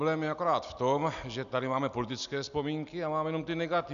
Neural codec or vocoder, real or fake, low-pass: none; real; 7.2 kHz